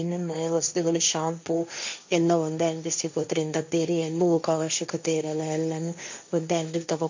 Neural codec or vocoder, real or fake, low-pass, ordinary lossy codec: codec, 16 kHz, 1.1 kbps, Voila-Tokenizer; fake; 7.2 kHz; MP3, 48 kbps